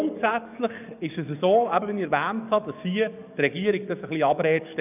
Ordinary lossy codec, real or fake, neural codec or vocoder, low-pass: none; fake; codec, 44.1 kHz, 7.8 kbps, DAC; 3.6 kHz